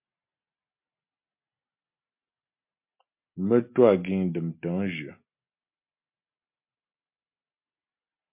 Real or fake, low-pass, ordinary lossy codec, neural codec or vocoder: real; 3.6 kHz; MP3, 32 kbps; none